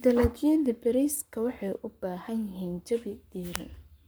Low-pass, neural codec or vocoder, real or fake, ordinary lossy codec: none; codec, 44.1 kHz, 7.8 kbps, Pupu-Codec; fake; none